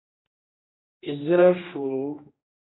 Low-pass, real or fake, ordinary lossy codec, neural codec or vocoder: 7.2 kHz; fake; AAC, 16 kbps; codec, 16 kHz, 1 kbps, X-Codec, HuBERT features, trained on general audio